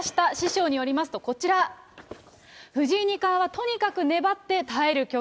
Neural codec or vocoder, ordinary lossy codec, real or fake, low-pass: none; none; real; none